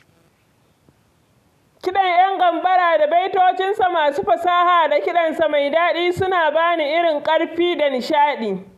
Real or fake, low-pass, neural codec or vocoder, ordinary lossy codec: real; 14.4 kHz; none; none